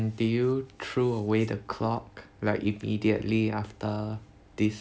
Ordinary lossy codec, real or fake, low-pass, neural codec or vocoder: none; real; none; none